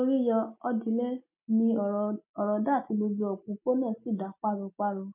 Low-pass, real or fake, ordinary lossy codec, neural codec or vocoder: 3.6 kHz; real; MP3, 32 kbps; none